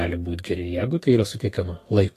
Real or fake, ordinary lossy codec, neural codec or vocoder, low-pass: fake; AAC, 48 kbps; codec, 32 kHz, 1.9 kbps, SNAC; 14.4 kHz